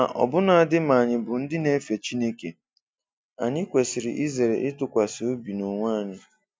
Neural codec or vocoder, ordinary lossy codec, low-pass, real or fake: none; none; none; real